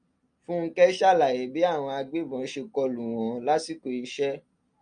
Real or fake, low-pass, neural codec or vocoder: real; 9.9 kHz; none